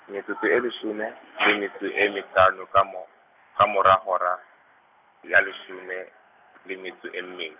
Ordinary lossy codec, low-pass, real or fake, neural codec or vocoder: none; 3.6 kHz; real; none